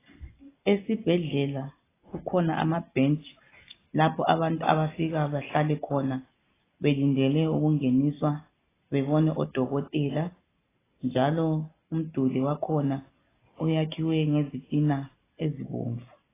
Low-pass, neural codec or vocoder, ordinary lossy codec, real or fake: 3.6 kHz; none; AAC, 16 kbps; real